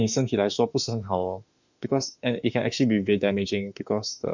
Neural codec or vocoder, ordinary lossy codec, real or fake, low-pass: autoencoder, 48 kHz, 32 numbers a frame, DAC-VAE, trained on Japanese speech; none; fake; 7.2 kHz